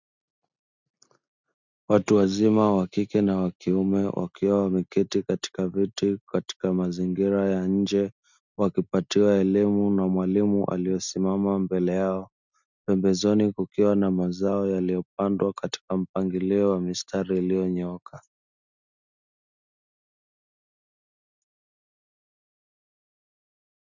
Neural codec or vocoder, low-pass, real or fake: none; 7.2 kHz; real